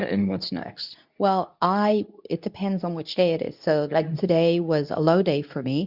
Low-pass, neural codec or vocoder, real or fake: 5.4 kHz; codec, 24 kHz, 0.9 kbps, WavTokenizer, medium speech release version 2; fake